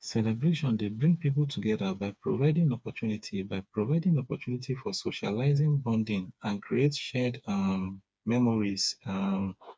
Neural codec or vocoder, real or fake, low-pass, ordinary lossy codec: codec, 16 kHz, 4 kbps, FreqCodec, smaller model; fake; none; none